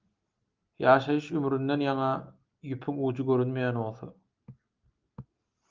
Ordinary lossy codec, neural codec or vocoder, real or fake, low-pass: Opus, 24 kbps; none; real; 7.2 kHz